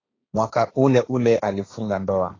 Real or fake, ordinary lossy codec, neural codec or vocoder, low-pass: fake; AAC, 32 kbps; codec, 16 kHz, 1.1 kbps, Voila-Tokenizer; 7.2 kHz